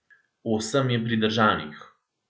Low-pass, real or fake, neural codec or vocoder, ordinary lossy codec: none; real; none; none